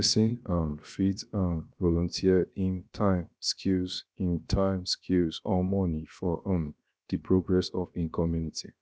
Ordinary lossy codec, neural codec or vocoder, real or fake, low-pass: none; codec, 16 kHz, 0.7 kbps, FocalCodec; fake; none